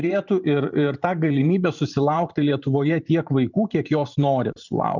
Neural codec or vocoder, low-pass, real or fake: vocoder, 44.1 kHz, 128 mel bands every 512 samples, BigVGAN v2; 7.2 kHz; fake